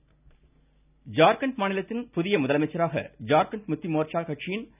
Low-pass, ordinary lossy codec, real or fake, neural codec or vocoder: 3.6 kHz; none; real; none